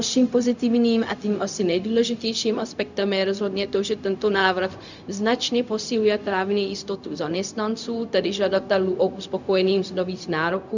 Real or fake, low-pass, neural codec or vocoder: fake; 7.2 kHz; codec, 16 kHz, 0.4 kbps, LongCat-Audio-Codec